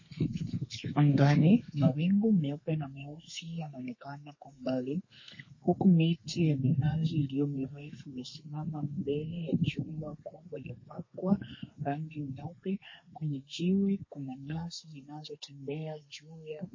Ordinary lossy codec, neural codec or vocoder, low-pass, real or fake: MP3, 32 kbps; codec, 32 kHz, 1.9 kbps, SNAC; 7.2 kHz; fake